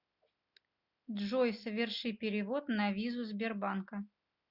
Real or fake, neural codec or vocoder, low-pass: real; none; 5.4 kHz